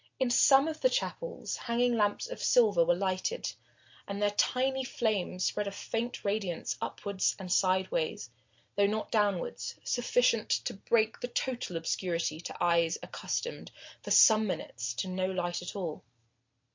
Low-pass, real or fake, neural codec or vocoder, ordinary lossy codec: 7.2 kHz; real; none; MP3, 48 kbps